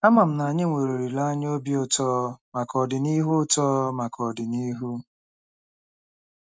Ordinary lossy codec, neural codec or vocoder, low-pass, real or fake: none; none; none; real